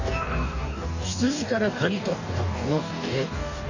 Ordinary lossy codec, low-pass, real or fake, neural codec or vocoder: none; 7.2 kHz; fake; codec, 44.1 kHz, 2.6 kbps, DAC